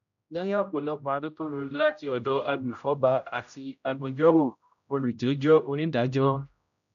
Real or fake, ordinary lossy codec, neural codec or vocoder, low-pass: fake; none; codec, 16 kHz, 0.5 kbps, X-Codec, HuBERT features, trained on general audio; 7.2 kHz